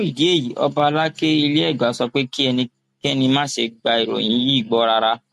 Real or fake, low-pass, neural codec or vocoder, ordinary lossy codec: real; 14.4 kHz; none; MP3, 64 kbps